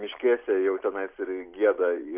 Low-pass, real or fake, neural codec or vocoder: 3.6 kHz; real; none